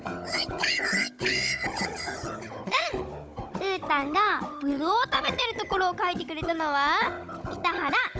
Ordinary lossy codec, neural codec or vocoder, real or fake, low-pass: none; codec, 16 kHz, 16 kbps, FunCodec, trained on Chinese and English, 50 frames a second; fake; none